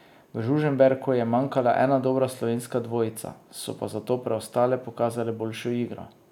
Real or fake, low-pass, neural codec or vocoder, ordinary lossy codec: real; 19.8 kHz; none; none